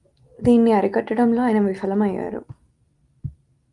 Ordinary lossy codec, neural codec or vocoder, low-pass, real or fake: Opus, 32 kbps; none; 10.8 kHz; real